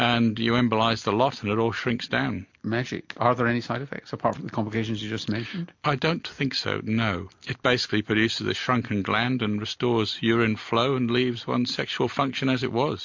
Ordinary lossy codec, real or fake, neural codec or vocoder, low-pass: MP3, 48 kbps; real; none; 7.2 kHz